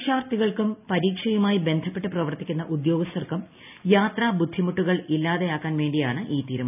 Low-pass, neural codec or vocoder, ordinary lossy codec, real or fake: 3.6 kHz; none; none; real